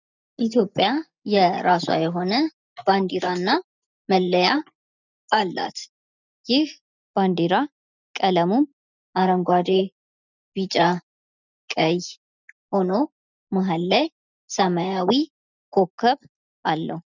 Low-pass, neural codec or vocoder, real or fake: 7.2 kHz; none; real